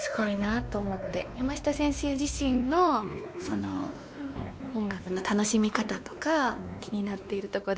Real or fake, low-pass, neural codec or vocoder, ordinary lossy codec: fake; none; codec, 16 kHz, 2 kbps, X-Codec, WavLM features, trained on Multilingual LibriSpeech; none